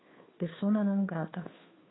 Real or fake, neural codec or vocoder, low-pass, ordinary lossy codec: fake; codec, 16 kHz, 2 kbps, FunCodec, trained on Chinese and English, 25 frames a second; 7.2 kHz; AAC, 16 kbps